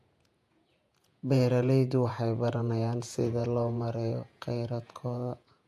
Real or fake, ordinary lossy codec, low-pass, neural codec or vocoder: fake; AAC, 96 kbps; 14.4 kHz; vocoder, 48 kHz, 128 mel bands, Vocos